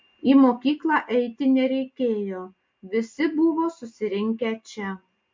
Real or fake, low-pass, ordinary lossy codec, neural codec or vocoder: real; 7.2 kHz; MP3, 48 kbps; none